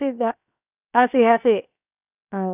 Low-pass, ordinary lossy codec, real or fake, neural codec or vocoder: 3.6 kHz; none; fake; codec, 16 kHz, 0.7 kbps, FocalCodec